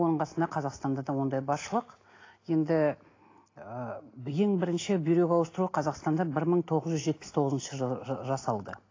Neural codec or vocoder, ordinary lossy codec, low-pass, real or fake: none; AAC, 32 kbps; 7.2 kHz; real